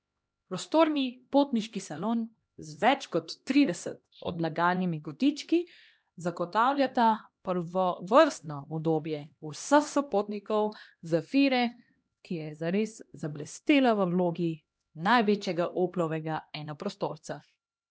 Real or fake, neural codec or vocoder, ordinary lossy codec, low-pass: fake; codec, 16 kHz, 1 kbps, X-Codec, HuBERT features, trained on LibriSpeech; none; none